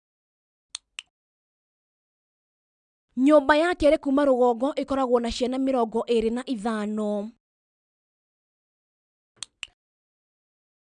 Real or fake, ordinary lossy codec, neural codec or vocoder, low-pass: real; none; none; 9.9 kHz